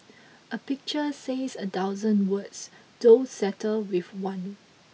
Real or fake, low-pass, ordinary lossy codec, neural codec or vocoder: real; none; none; none